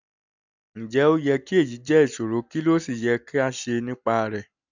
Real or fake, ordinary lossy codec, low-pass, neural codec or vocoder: real; none; 7.2 kHz; none